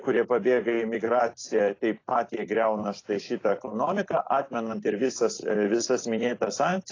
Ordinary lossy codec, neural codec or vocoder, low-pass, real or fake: AAC, 32 kbps; vocoder, 44.1 kHz, 128 mel bands every 256 samples, BigVGAN v2; 7.2 kHz; fake